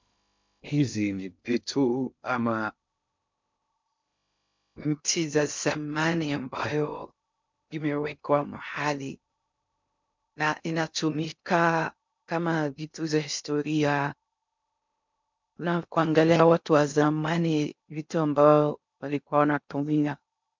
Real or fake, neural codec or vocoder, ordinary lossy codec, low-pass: fake; codec, 16 kHz in and 24 kHz out, 0.6 kbps, FocalCodec, streaming, 2048 codes; MP3, 64 kbps; 7.2 kHz